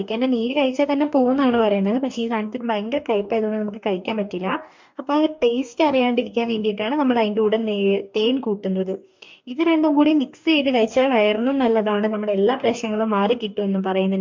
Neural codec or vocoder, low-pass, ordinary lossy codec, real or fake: codec, 44.1 kHz, 2.6 kbps, DAC; 7.2 kHz; MP3, 64 kbps; fake